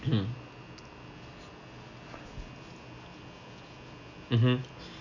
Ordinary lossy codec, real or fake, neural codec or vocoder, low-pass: none; real; none; 7.2 kHz